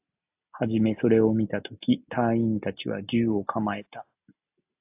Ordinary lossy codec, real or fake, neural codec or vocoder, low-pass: AAC, 32 kbps; real; none; 3.6 kHz